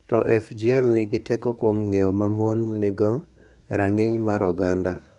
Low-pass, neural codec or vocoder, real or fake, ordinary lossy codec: 10.8 kHz; codec, 24 kHz, 1 kbps, SNAC; fake; none